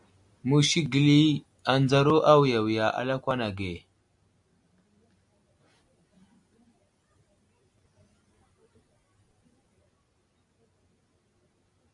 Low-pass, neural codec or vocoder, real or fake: 10.8 kHz; none; real